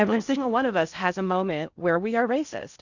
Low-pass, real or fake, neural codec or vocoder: 7.2 kHz; fake; codec, 16 kHz in and 24 kHz out, 0.8 kbps, FocalCodec, streaming, 65536 codes